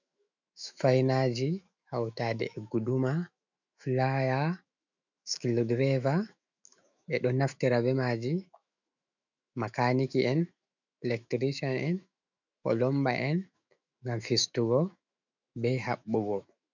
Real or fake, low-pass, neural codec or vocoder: fake; 7.2 kHz; autoencoder, 48 kHz, 128 numbers a frame, DAC-VAE, trained on Japanese speech